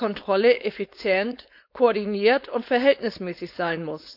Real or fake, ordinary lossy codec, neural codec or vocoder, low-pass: fake; none; codec, 16 kHz, 4.8 kbps, FACodec; 5.4 kHz